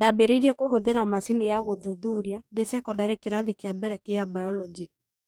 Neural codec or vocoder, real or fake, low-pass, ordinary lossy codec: codec, 44.1 kHz, 2.6 kbps, DAC; fake; none; none